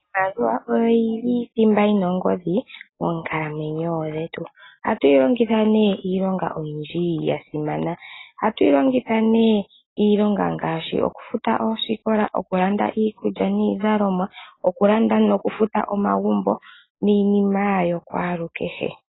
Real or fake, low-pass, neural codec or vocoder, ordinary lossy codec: real; 7.2 kHz; none; AAC, 16 kbps